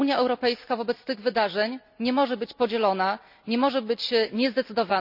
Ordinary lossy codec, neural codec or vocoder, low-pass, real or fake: none; none; 5.4 kHz; real